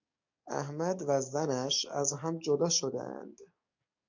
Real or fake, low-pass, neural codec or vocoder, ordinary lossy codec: fake; 7.2 kHz; codec, 44.1 kHz, 7.8 kbps, DAC; MP3, 64 kbps